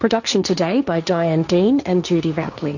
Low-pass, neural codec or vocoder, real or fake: 7.2 kHz; codec, 16 kHz, 1.1 kbps, Voila-Tokenizer; fake